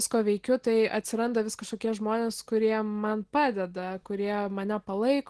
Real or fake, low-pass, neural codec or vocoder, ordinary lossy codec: real; 10.8 kHz; none; Opus, 16 kbps